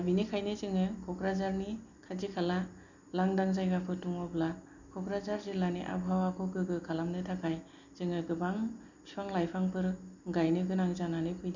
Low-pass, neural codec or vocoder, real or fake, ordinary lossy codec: 7.2 kHz; none; real; none